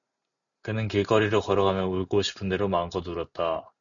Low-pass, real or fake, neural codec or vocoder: 7.2 kHz; real; none